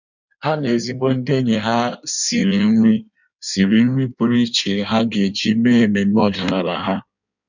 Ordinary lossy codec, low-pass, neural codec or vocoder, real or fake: none; 7.2 kHz; codec, 16 kHz in and 24 kHz out, 1.1 kbps, FireRedTTS-2 codec; fake